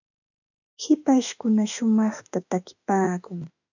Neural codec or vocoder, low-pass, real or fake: autoencoder, 48 kHz, 32 numbers a frame, DAC-VAE, trained on Japanese speech; 7.2 kHz; fake